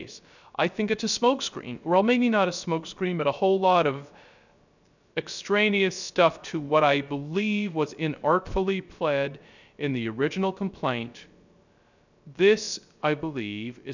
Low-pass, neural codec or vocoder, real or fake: 7.2 kHz; codec, 16 kHz, 0.3 kbps, FocalCodec; fake